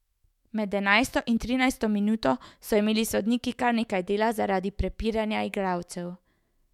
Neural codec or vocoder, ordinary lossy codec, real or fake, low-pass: autoencoder, 48 kHz, 128 numbers a frame, DAC-VAE, trained on Japanese speech; MP3, 96 kbps; fake; 19.8 kHz